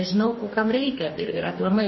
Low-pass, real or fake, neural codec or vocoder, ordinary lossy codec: 7.2 kHz; fake; codec, 44.1 kHz, 2.6 kbps, DAC; MP3, 24 kbps